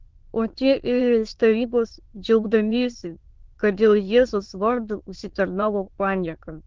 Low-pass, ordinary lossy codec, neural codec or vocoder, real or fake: 7.2 kHz; Opus, 16 kbps; autoencoder, 22.05 kHz, a latent of 192 numbers a frame, VITS, trained on many speakers; fake